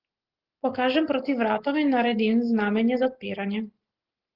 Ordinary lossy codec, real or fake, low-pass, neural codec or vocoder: Opus, 16 kbps; real; 5.4 kHz; none